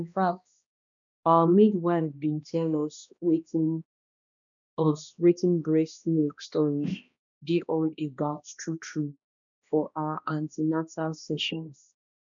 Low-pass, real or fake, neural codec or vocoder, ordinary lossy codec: 7.2 kHz; fake; codec, 16 kHz, 1 kbps, X-Codec, HuBERT features, trained on balanced general audio; none